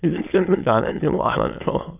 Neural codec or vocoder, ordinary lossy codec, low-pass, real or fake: autoencoder, 22.05 kHz, a latent of 192 numbers a frame, VITS, trained on many speakers; none; 3.6 kHz; fake